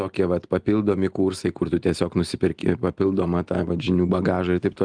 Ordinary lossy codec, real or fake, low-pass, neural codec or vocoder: Opus, 24 kbps; real; 9.9 kHz; none